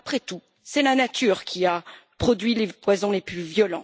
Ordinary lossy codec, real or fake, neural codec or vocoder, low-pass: none; real; none; none